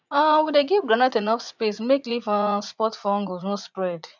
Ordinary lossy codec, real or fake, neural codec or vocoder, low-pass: none; fake; vocoder, 44.1 kHz, 80 mel bands, Vocos; 7.2 kHz